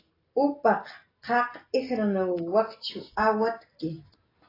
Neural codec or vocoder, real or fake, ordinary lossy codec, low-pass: none; real; AAC, 24 kbps; 5.4 kHz